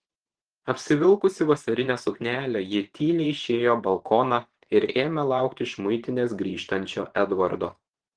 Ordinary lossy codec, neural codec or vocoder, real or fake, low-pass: Opus, 16 kbps; vocoder, 24 kHz, 100 mel bands, Vocos; fake; 9.9 kHz